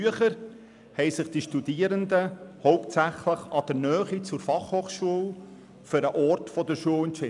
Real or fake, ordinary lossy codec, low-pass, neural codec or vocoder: real; none; 10.8 kHz; none